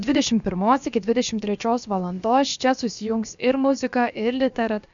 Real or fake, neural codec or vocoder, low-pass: fake; codec, 16 kHz, about 1 kbps, DyCAST, with the encoder's durations; 7.2 kHz